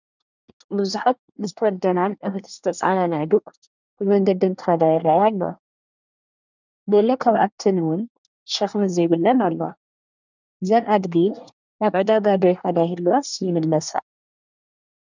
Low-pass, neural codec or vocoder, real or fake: 7.2 kHz; codec, 24 kHz, 1 kbps, SNAC; fake